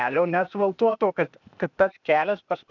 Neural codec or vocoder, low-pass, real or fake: codec, 16 kHz, 0.8 kbps, ZipCodec; 7.2 kHz; fake